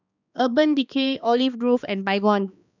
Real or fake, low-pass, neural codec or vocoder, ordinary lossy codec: fake; 7.2 kHz; codec, 16 kHz, 2 kbps, X-Codec, HuBERT features, trained on balanced general audio; none